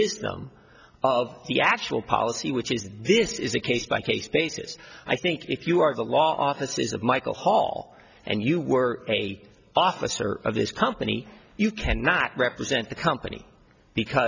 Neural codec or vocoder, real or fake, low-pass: none; real; 7.2 kHz